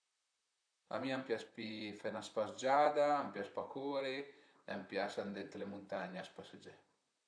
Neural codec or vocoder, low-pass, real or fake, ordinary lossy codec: vocoder, 44.1 kHz, 128 mel bands, Pupu-Vocoder; 9.9 kHz; fake; none